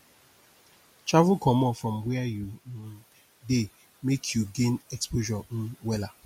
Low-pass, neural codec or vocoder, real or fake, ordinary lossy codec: 19.8 kHz; none; real; MP3, 64 kbps